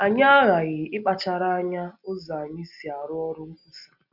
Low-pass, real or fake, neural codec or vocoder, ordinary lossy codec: 5.4 kHz; real; none; Opus, 64 kbps